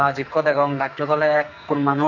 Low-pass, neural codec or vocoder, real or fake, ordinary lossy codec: 7.2 kHz; codec, 44.1 kHz, 2.6 kbps, SNAC; fake; none